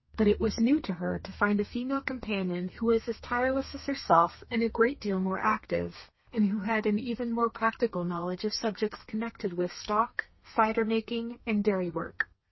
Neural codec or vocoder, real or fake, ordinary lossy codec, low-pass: codec, 32 kHz, 1.9 kbps, SNAC; fake; MP3, 24 kbps; 7.2 kHz